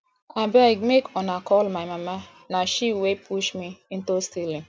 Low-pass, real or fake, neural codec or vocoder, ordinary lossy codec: none; real; none; none